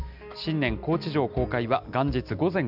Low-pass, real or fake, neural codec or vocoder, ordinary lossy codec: 5.4 kHz; real; none; none